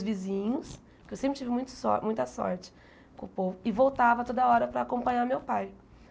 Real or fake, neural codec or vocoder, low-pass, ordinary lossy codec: real; none; none; none